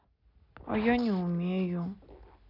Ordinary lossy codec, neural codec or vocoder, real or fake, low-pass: none; none; real; 5.4 kHz